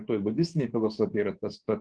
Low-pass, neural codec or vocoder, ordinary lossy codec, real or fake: 9.9 kHz; codec, 24 kHz, 3.1 kbps, DualCodec; Opus, 16 kbps; fake